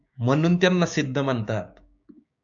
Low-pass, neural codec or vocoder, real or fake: 7.2 kHz; codec, 16 kHz, 6 kbps, DAC; fake